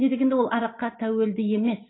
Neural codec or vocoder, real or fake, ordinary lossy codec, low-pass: none; real; AAC, 16 kbps; 7.2 kHz